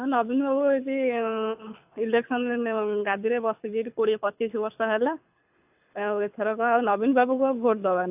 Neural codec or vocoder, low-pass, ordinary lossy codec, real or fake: codec, 24 kHz, 6 kbps, HILCodec; 3.6 kHz; AAC, 32 kbps; fake